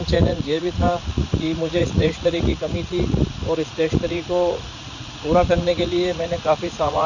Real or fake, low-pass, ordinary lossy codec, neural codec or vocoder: fake; 7.2 kHz; none; vocoder, 44.1 kHz, 80 mel bands, Vocos